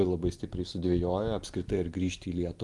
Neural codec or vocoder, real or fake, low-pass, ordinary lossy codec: none; real; 10.8 kHz; Opus, 24 kbps